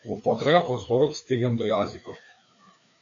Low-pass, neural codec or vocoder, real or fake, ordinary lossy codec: 7.2 kHz; codec, 16 kHz, 2 kbps, FreqCodec, larger model; fake; MP3, 64 kbps